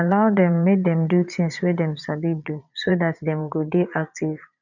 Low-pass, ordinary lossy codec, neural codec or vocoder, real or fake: 7.2 kHz; none; vocoder, 22.05 kHz, 80 mel bands, Vocos; fake